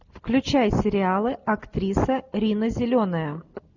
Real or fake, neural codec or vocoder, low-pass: real; none; 7.2 kHz